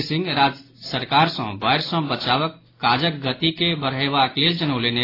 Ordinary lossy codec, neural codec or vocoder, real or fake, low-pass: AAC, 24 kbps; none; real; 5.4 kHz